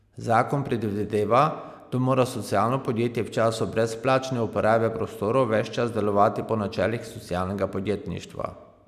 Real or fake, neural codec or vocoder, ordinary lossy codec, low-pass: real; none; none; 14.4 kHz